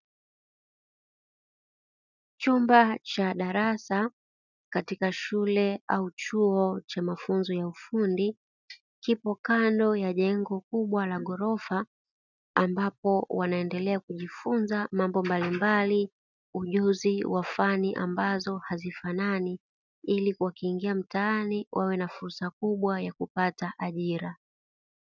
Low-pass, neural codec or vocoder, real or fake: 7.2 kHz; none; real